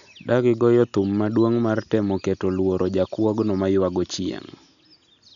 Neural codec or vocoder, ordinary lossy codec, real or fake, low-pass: none; none; real; 7.2 kHz